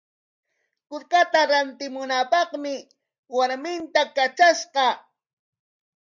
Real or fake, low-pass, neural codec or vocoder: real; 7.2 kHz; none